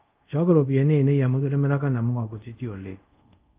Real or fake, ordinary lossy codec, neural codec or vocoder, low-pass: fake; Opus, 32 kbps; codec, 24 kHz, 0.5 kbps, DualCodec; 3.6 kHz